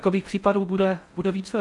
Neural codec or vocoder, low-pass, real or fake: codec, 16 kHz in and 24 kHz out, 0.6 kbps, FocalCodec, streaming, 4096 codes; 10.8 kHz; fake